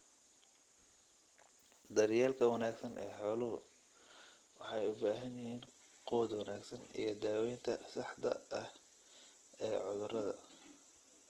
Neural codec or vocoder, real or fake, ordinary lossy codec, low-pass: none; real; Opus, 16 kbps; 19.8 kHz